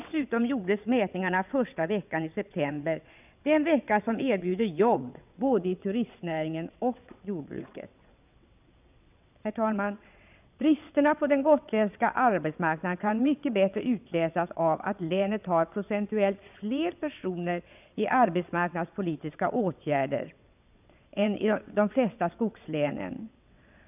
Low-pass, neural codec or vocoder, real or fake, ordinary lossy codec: 3.6 kHz; vocoder, 22.05 kHz, 80 mel bands, WaveNeXt; fake; none